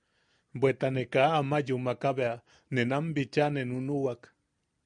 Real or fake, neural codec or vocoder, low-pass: real; none; 9.9 kHz